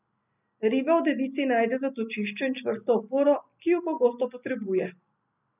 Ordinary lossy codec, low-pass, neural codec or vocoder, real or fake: none; 3.6 kHz; none; real